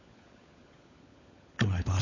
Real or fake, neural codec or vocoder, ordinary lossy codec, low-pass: fake; codec, 16 kHz, 16 kbps, FunCodec, trained on LibriTTS, 50 frames a second; MP3, 32 kbps; 7.2 kHz